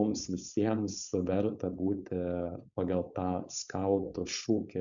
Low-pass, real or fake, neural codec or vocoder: 7.2 kHz; fake; codec, 16 kHz, 4.8 kbps, FACodec